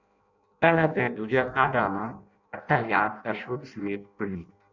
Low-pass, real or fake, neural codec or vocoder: 7.2 kHz; fake; codec, 16 kHz in and 24 kHz out, 0.6 kbps, FireRedTTS-2 codec